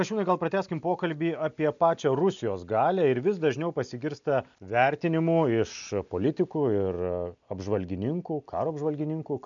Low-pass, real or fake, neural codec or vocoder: 7.2 kHz; real; none